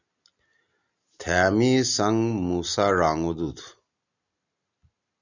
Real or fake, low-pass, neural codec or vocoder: real; 7.2 kHz; none